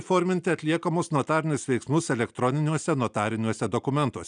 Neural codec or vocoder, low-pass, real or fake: none; 9.9 kHz; real